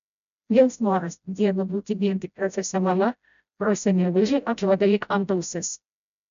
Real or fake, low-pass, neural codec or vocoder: fake; 7.2 kHz; codec, 16 kHz, 0.5 kbps, FreqCodec, smaller model